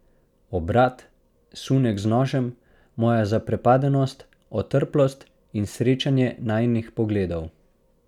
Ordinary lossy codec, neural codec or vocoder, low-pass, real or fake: none; none; 19.8 kHz; real